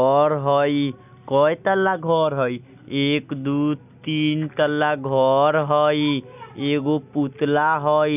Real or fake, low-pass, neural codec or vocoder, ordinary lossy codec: real; 3.6 kHz; none; none